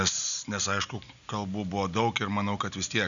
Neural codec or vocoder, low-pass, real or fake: none; 7.2 kHz; real